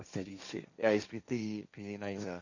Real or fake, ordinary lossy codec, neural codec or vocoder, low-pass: fake; none; codec, 16 kHz, 1.1 kbps, Voila-Tokenizer; 7.2 kHz